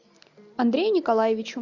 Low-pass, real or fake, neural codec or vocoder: 7.2 kHz; real; none